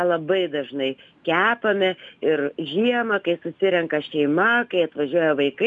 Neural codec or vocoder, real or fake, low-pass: none; real; 10.8 kHz